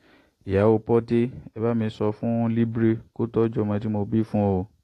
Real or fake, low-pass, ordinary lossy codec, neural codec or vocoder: real; 14.4 kHz; AAC, 48 kbps; none